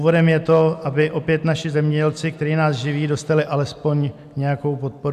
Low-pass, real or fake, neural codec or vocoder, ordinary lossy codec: 14.4 kHz; real; none; MP3, 96 kbps